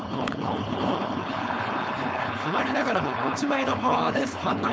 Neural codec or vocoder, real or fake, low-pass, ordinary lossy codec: codec, 16 kHz, 4.8 kbps, FACodec; fake; none; none